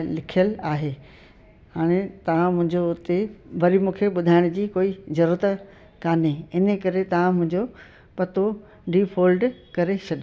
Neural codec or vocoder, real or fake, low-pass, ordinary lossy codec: none; real; none; none